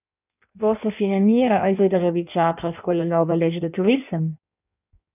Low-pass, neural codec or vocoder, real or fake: 3.6 kHz; codec, 16 kHz in and 24 kHz out, 1.1 kbps, FireRedTTS-2 codec; fake